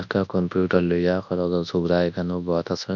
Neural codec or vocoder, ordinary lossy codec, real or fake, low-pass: codec, 24 kHz, 0.9 kbps, WavTokenizer, large speech release; none; fake; 7.2 kHz